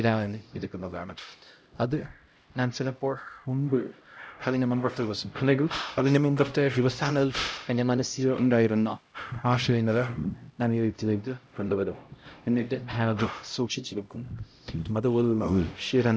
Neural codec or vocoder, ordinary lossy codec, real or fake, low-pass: codec, 16 kHz, 0.5 kbps, X-Codec, HuBERT features, trained on LibriSpeech; none; fake; none